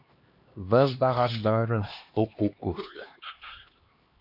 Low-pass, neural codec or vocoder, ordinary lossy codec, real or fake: 5.4 kHz; codec, 16 kHz, 2 kbps, X-Codec, HuBERT features, trained on LibriSpeech; AAC, 48 kbps; fake